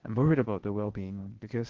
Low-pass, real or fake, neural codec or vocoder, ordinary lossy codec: 7.2 kHz; fake; codec, 16 kHz, 0.8 kbps, ZipCodec; Opus, 16 kbps